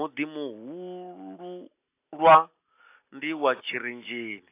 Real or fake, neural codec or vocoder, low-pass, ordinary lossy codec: real; none; 3.6 kHz; AAC, 24 kbps